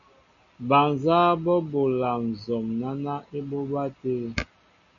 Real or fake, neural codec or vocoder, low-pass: real; none; 7.2 kHz